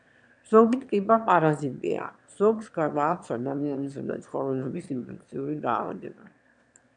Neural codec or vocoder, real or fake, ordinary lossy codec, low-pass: autoencoder, 22.05 kHz, a latent of 192 numbers a frame, VITS, trained on one speaker; fake; MP3, 96 kbps; 9.9 kHz